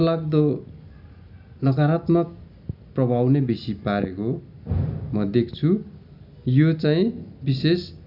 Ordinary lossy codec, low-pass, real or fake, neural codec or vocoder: none; 5.4 kHz; real; none